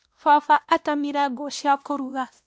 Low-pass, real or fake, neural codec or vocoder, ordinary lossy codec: none; fake; codec, 16 kHz, 1 kbps, X-Codec, WavLM features, trained on Multilingual LibriSpeech; none